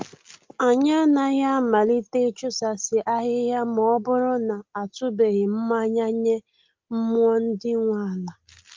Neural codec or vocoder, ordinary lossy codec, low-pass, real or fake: none; Opus, 32 kbps; 7.2 kHz; real